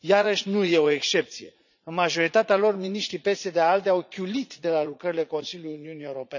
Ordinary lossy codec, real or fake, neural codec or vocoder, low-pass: none; fake; vocoder, 44.1 kHz, 80 mel bands, Vocos; 7.2 kHz